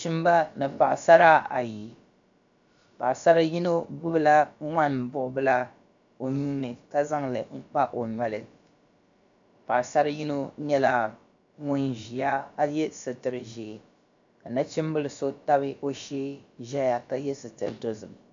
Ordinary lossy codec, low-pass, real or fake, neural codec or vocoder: MP3, 64 kbps; 7.2 kHz; fake; codec, 16 kHz, about 1 kbps, DyCAST, with the encoder's durations